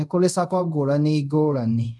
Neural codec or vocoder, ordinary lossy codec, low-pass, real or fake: codec, 24 kHz, 0.5 kbps, DualCodec; none; none; fake